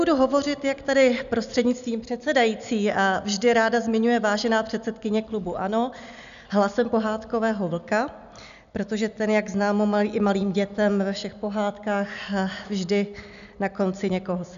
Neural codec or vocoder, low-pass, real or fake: none; 7.2 kHz; real